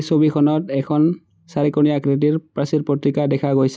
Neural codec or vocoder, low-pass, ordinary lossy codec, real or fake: none; none; none; real